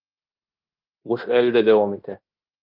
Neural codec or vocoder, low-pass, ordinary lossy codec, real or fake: codec, 16 kHz in and 24 kHz out, 0.9 kbps, LongCat-Audio-Codec, fine tuned four codebook decoder; 5.4 kHz; Opus, 24 kbps; fake